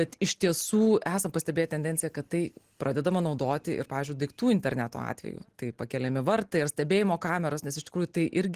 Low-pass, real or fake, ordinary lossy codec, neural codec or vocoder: 14.4 kHz; real; Opus, 16 kbps; none